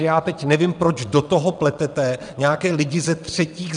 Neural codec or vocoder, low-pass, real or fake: vocoder, 22.05 kHz, 80 mel bands, WaveNeXt; 9.9 kHz; fake